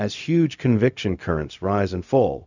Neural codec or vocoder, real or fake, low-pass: codec, 16 kHz, 0.4 kbps, LongCat-Audio-Codec; fake; 7.2 kHz